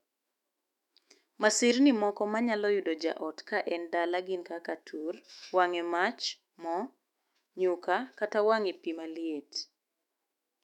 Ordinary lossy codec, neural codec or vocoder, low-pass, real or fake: none; autoencoder, 48 kHz, 128 numbers a frame, DAC-VAE, trained on Japanese speech; 19.8 kHz; fake